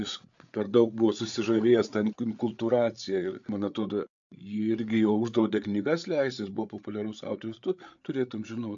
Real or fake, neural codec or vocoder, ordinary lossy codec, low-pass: fake; codec, 16 kHz, 8 kbps, FreqCodec, larger model; AAC, 64 kbps; 7.2 kHz